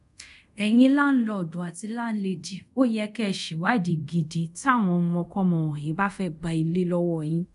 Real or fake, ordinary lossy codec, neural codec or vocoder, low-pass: fake; none; codec, 24 kHz, 0.5 kbps, DualCodec; 10.8 kHz